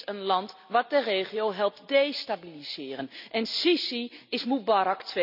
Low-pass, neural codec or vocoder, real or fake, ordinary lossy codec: 5.4 kHz; none; real; none